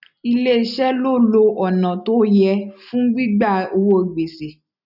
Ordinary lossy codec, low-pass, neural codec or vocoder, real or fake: none; 5.4 kHz; none; real